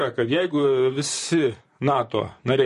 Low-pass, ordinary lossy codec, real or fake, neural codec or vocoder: 14.4 kHz; MP3, 48 kbps; fake; vocoder, 44.1 kHz, 128 mel bands, Pupu-Vocoder